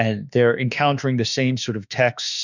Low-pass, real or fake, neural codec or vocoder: 7.2 kHz; fake; autoencoder, 48 kHz, 32 numbers a frame, DAC-VAE, trained on Japanese speech